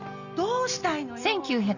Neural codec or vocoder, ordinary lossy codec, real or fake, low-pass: none; none; real; 7.2 kHz